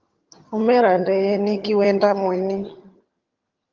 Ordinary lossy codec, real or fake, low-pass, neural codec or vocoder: Opus, 32 kbps; fake; 7.2 kHz; vocoder, 22.05 kHz, 80 mel bands, HiFi-GAN